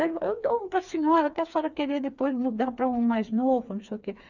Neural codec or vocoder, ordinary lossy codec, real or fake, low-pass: codec, 16 kHz, 4 kbps, FreqCodec, smaller model; none; fake; 7.2 kHz